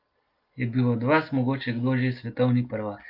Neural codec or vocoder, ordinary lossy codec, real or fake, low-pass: none; Opus, 32 kbps; real; 5.4 kHz